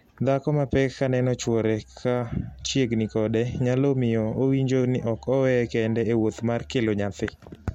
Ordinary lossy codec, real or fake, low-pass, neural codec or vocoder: MP3, 64 kbps; real; 19.8 kHz; none